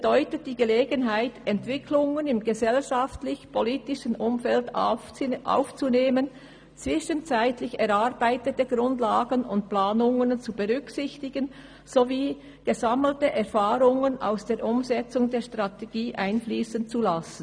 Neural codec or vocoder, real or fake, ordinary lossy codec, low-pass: none; real; none; 9.9 kHz